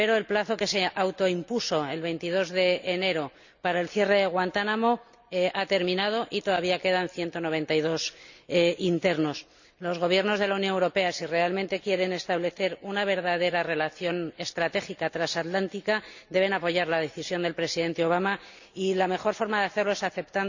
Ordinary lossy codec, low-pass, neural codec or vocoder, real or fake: none; 7.2 kHz; none; real